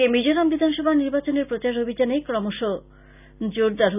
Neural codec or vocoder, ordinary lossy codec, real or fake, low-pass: none; none; real; 3.6 kHz